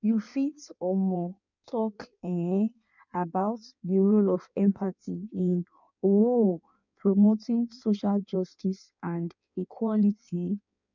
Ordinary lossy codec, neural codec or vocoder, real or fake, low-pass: none; codec, 16 kHz in and 24 kHz out, 1.1 kbps, FireRedTTS-2 codec; fake; 7.2 kHz